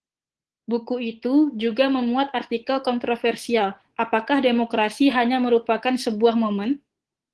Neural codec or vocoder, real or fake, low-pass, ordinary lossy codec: codec, 24 kHz, 3.1 kbps, DualCodec; fake; 10.8 kHz; Opus, 16 kbps